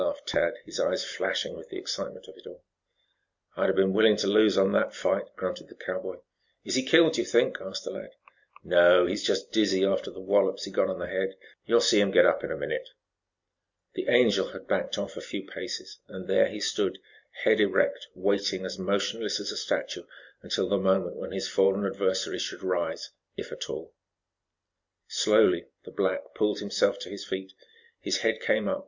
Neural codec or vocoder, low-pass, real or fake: none; 7.2 kHz; real